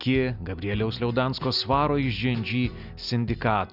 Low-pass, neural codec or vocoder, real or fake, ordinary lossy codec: 5.4 kHz; none; real; Opus, 64 kbps